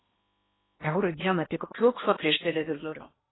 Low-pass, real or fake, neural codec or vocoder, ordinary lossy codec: 7.2 kHz; fake; codec, 16 kHz in and 24 kHz out, 0.8 kbps, FocalCodec, streaming, 65536 codes; AAC, 16 kbps